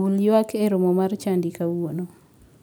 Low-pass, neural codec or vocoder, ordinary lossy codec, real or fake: none; none; none; real